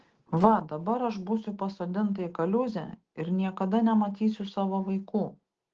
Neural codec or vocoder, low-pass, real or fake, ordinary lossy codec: none; 7.2 kHz; real; Opus, 16 kbps